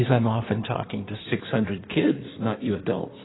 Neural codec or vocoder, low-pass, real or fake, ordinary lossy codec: codec, 16 kHz in and 24 kHz out, 1.1 kbps, FireRedTTS-2 codec; 7.2 kHz; fake; AAC, 16 kbps